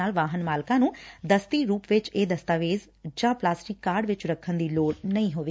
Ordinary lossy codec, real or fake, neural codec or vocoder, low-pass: none; real; none; none